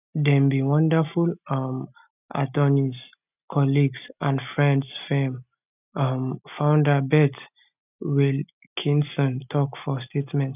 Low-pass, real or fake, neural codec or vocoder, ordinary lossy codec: 3.6 kHz; real; none; none